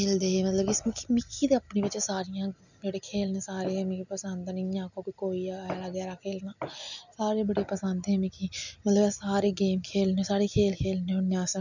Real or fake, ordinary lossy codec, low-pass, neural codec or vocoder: real; none; 7.2 kHz; none